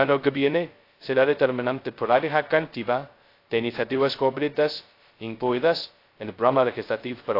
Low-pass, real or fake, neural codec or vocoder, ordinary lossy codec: 5.4 kHz; fake; codec, 16 kHz, 0.2 kbps, FocalCodec; AAC, 32 kbps